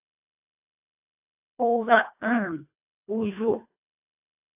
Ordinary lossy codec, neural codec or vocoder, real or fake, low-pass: AAC, 24 kbps; codec, 24 kHz, 1.5 kbps, HILCodec; fake; 3.6 kHz